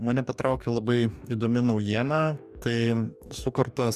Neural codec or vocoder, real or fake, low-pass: codec, 44.1 kHz, 2.6 kbps, DAC; fake; 14.4 kHz